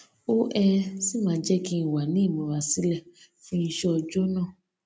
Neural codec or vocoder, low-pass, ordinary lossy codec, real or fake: none; none; none; real